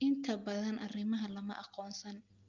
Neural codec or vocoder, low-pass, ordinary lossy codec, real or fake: none; 7.2 kHz; Opus, 24 kbps; real